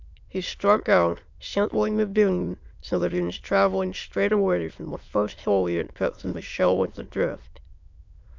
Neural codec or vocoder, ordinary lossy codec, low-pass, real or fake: autoencoder, 22.05 kHz, a latent of 192 numbers a frame, VITS, trained on many speakers; MP3, 64 kbps; 7.2 kHz; fake